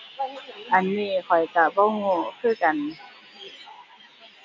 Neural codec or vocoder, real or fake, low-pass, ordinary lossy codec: none; real; 7.2 kHz; MP3, 48 kbps